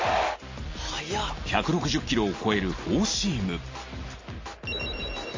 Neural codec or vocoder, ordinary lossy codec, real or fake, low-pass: none; MP3, 32 kbps; real; 7.2 kHz